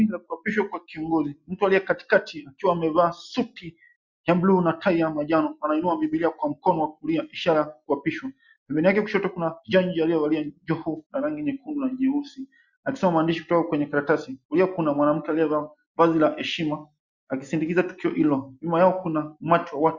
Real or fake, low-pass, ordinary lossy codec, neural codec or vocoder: real; 7.2 kHz; Opus, 64 kbps; none